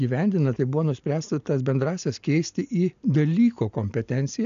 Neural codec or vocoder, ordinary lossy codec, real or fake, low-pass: none; AAC, 96 kbps; real; 7.2 kHz